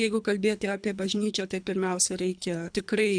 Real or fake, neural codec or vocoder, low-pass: fake; codec, 24 kHz, 3 kbps, HILCodec; 9.9 kHz